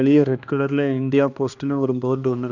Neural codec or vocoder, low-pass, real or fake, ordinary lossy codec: codec, 16 kHz, 2 kbps, X-Codec, HuBERT features, trained on balanced general audio; 7.2 kHz; fake; none